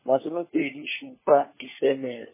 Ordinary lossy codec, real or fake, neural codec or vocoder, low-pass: MP3, 16 kbps; fake; codec, 16 kHz, 4 kbps, FunCodec, trained on LibriTTS, 50 frames a second; 3.6 kHz